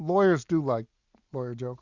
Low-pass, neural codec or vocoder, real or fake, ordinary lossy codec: 7.2 kHz; none; real; Opus, 64 kbps